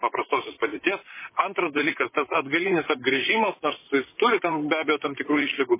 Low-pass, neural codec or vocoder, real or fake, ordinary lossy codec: 3.6 kHz; vocoder, 44.1 kHz, 128 mel bands, Pupu-Vocoder; fake; MP3, 16 kbps